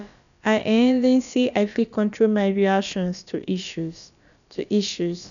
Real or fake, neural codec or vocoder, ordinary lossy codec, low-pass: fake; codec, 16 kHz, about 1 kbps, DyCAST, with the encoder's durations; none; 7.2 kHz